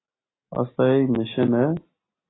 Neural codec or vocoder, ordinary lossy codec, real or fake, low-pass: none; AAC, 16 kbps; real; 7.2 kHz